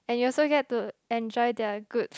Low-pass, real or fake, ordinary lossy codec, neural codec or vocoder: none; real; none; none